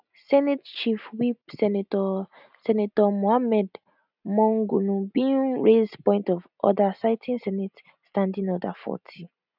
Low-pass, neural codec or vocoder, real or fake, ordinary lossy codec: 5.4 kHz; none; real; none